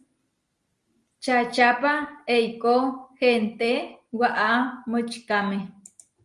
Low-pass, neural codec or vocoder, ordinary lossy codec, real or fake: 10.8 kHz; none; Opus, 32 kbps; real